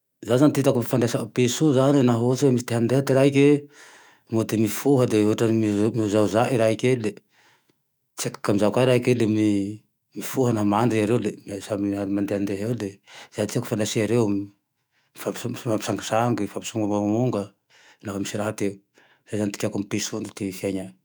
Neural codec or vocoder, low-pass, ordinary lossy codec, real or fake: autoencoder, 48 kHz, 128 numbers a frame, DAC-VAE, trained on Japanese speech; none; none; fake